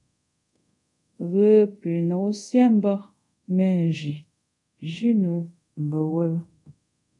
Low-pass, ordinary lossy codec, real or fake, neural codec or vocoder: 10.8 kHz; MP3, 96 kbps; fake; codec, 24 kHz, 0.5 kbps, DualCodec